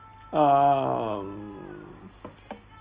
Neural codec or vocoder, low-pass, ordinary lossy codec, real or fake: none; 3.6 kHz; Opus, 24 kbps; real